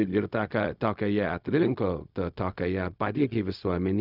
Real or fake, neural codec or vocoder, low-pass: fake; codec, 16 kHz, 0.4 kbps, LongCat-Audio-Codec; 5.4 kHz